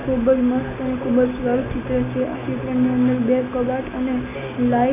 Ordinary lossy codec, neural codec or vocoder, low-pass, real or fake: none; none; 3.6 kHz; real